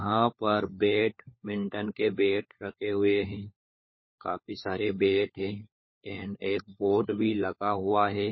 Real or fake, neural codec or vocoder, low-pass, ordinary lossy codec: fake; codec, 16 kHz, 4 kbps, FunCodec, trained on LibriTTS, 50 frames a second; 7.2 kHz; MP3, 24 kbps